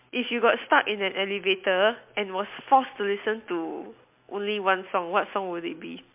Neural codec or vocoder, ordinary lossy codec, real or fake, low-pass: none; MP3, 32 kbps; real; 3.6 kHz